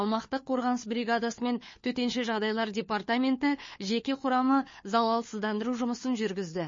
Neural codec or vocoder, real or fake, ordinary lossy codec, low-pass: codec, 16 kHz, 4 kbps, FunCodec, trained on LibriTTS, 50 frames a second; fake; MP3, 32 kbps; 7.2 kHz